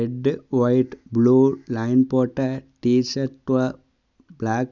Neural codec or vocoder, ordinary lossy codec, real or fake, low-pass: none; none; real; 7.2 kHz